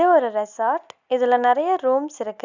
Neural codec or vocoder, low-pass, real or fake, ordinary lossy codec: none; 7.2 kHz; real; none